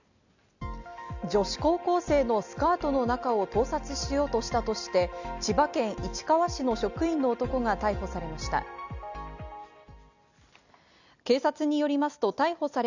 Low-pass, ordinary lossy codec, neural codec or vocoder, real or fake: 7.2 kHz; none; none; real